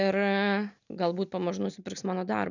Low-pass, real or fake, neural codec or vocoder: 7.2 kHz; real; none